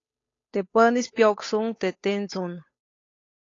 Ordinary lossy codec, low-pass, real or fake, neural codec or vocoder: AAC, 32 kbps; 7.2 kHz; fake; codec, 16 kHz, 8 kbps, FunCodec, trained on Chinese and English, 25 frames a second